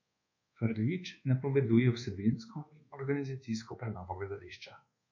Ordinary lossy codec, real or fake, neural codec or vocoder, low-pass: MP3, 48 kbps; fake; codec, 24 kHz, 1.2 kbps, DualCodec; 7.2 kHz